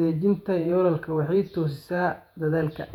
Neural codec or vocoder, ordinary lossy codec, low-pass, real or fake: vocoder, 48 kHz, 128 mel bands, Vocos; none; 19.8 kHz; fake